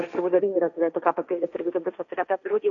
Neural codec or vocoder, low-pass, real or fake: codec, 16 kHz, 1.1 kbps, Voila-Tokenizer; 7.2 kHz; fake